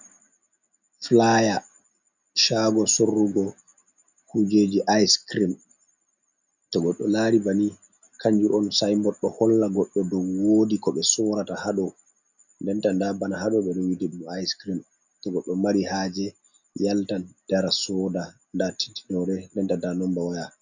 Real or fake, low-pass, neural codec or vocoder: real; 7.2 kHz; none